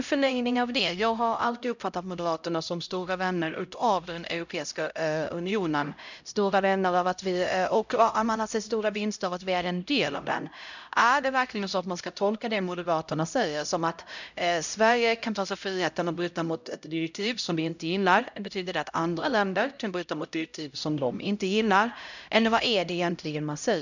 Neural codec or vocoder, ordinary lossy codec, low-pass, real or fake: codec, 16 kHz, 0.5 kbps, X-Codec, HuBERT features, trained on LibriSpeech; none; 7.2 kHz; fake